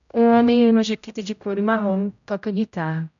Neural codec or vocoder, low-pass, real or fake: codec, 16 kHz, 0.5 kbps, X-Codec, HuBERT features, trained on general audio; 7.2 kHz; fake